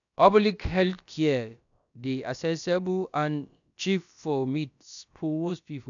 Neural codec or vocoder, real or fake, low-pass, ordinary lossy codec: codec, 16 kHz, about 1 kbps, DyCAST, with the encoder's durations; fake; 7.2 kHz; none